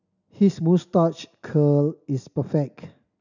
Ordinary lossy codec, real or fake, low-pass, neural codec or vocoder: none; real; 7.2 kHz; none